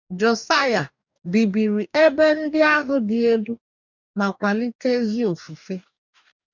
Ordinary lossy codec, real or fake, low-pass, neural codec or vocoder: none; fake; 7.2 kHz; codec, 44.1 kHz, 2.6 kbps, DAC